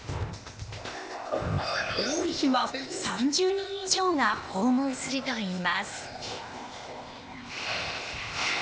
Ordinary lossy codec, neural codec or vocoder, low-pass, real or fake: none; codec, 16 kHz, 0.8 kbps, ZipCodec; none; fake